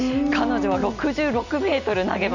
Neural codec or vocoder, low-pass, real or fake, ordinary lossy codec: none; 7.2 kHz; real; none